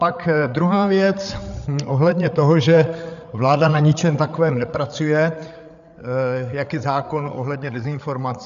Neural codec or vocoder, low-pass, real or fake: codec, 16 kHz, 8 kbps, FreqCodec, larger model; 7.2 kHz; fake